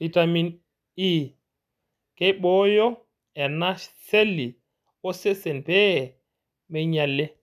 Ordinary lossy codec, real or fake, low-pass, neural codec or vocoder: none; real; 14.4 kHz; none